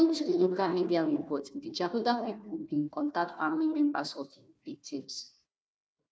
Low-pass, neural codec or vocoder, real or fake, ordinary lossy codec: none; codec, 16 kHz, 1 kbps, FunCodec, trained on Chinese and English, 50 frames a second; fake; none